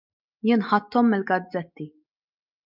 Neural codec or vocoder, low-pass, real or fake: none; 5.4 kHz; real